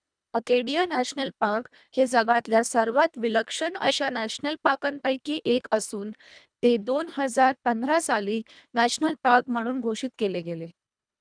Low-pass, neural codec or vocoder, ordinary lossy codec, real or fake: 9.9 kHz; codec, 24 kHz, 1.5 kbps, HILCodec; none; fake